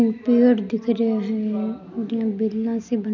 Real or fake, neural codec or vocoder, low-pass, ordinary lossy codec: real; none; 7.2 kHz; none